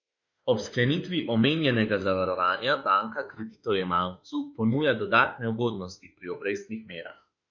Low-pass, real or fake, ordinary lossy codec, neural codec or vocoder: 7.2 kHz; fake; none; autoencoder, 48 kHz, 32 numbers a frame, DAC-VAE, trained on Japanese speech